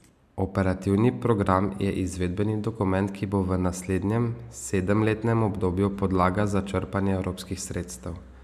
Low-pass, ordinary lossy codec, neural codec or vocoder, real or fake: 14.4 kHz; none; none; real